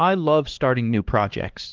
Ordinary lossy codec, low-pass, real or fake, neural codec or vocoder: Opus, 16 kbps; 7.2 kHz; fake; codec, 16 kHz, 2 kbps, X-Codec, HuBERT features, trained on LibriSpeech